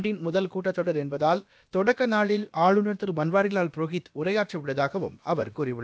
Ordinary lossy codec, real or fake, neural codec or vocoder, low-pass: none; fake; codec, 16 kHz, about 1 kbps, DyCAST, with the encoder's durations; none